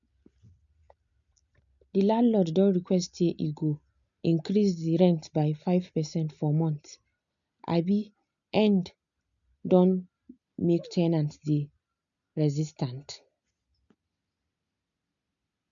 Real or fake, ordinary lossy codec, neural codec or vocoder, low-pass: real; MP3, 96 kbps; none; 7.2 kHz